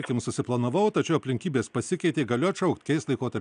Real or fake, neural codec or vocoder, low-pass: real; none; 9.9 kHz